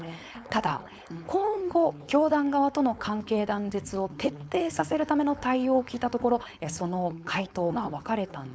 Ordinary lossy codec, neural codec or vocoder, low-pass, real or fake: none; codec, 16 kHz, 4.8 kbps, FACodec; none; fake